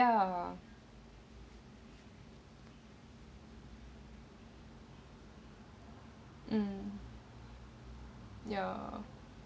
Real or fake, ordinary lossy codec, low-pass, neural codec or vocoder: real; none; none; none